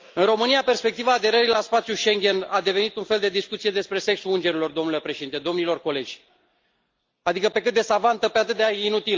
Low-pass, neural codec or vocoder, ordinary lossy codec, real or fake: 7.2 kHz; none; Opus, 32 kbps; real